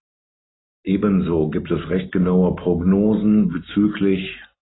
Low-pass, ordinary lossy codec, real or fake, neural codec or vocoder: 7.2 kHz; AAC, 16 kbps; real; none